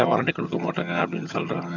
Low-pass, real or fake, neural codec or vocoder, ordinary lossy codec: 7.2 kHz; fake; vocoder, 22.05 kHz, 80 mel bands, HiFi-GAN; none